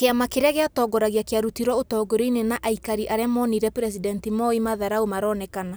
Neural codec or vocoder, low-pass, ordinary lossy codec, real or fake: none; none; none; real